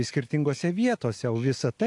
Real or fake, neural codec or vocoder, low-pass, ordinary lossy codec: real; none; 10.8 kHz; AAC, 64 kbps